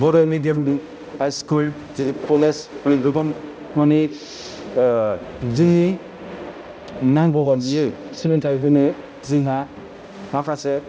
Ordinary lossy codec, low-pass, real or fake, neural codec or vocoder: none; none; fake; codec, 16 kHz, 0.5 kbps, X-Codec, HuBERT features, trained on balanced general audio